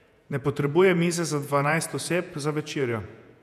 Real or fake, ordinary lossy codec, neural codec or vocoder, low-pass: fake; none; vocoder, 48 kHz, 128 mel bands, Vocos; 14.4 kHz